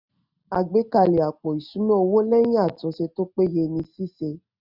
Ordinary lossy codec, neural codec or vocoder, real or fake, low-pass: none; none; real; 5.4 kHz